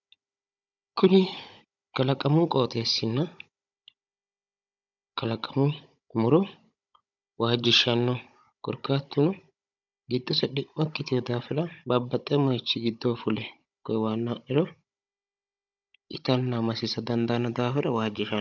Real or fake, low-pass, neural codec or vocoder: fake; 7.2 kHz; codec, 16 kHz, 16 kbps, FunCodec, trained on Chinese and English, 50 frames a second